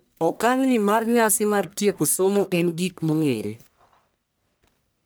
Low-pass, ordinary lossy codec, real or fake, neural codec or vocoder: none; none; fake; codec, 44.1 kHz, 1.7 kbps, Pupu-Codec